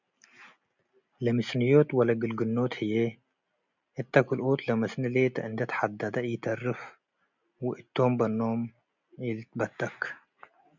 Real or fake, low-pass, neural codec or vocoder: real; 7.2 kHz; none